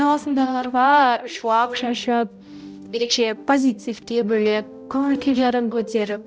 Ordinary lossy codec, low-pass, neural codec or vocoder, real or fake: none; none; codec, 16 kHz, 0.5 kbps, X-Codec, HuBERT features, trained on balanced general audio; fake